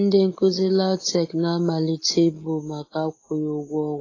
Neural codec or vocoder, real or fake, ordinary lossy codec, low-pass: none; real; AAC, 32 kbps; 7.2 kHz